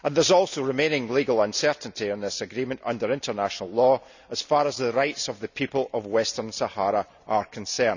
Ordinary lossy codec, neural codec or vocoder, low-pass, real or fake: none; none; 7.2 kHz; real